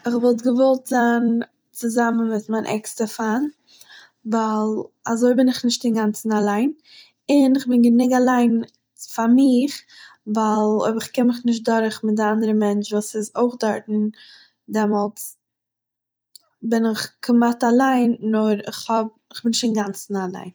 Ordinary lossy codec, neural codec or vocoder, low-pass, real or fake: none; vocoder, 44.1 kHz, 128 mel bands every 256 samples, BigVGAN v2; none; fake